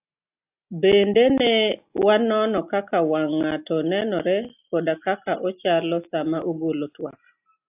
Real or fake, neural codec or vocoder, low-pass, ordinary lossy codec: real; none; 3.6 kHz; AAC, 32 kbps